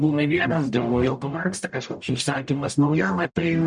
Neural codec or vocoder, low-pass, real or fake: codec, 44.1 kHz, 0.9 kbps, DAC; 10.8 kHz; fake